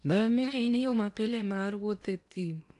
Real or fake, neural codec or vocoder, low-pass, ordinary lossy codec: fake; codec, 16 kHz in and 24 kHz out, 0.8 kbps, FocalCodec, streaming, 65536 codes; 10.8 kHz; none